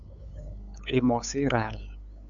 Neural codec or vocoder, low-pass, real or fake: codec, 16 kHz, 8 kbps, FunCodec, trained on LibriTTS, 25 frames a second; 7.2 kHz; fake